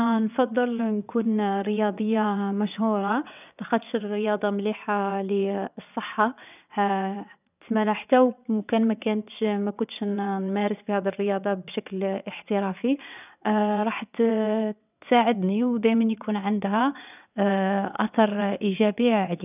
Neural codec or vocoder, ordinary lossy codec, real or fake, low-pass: vocoder, 22.05 kHz, 80 mel bands, WaveNeXt; none; fake; 3.6 kHz